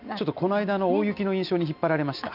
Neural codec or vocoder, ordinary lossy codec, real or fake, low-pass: none; none; real; 5.4 kHz